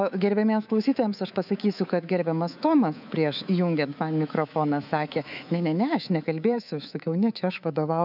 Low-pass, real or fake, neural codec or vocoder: 5.4 kHz; fake; codec, 24 kHz, 3.1 kbps, DualCodec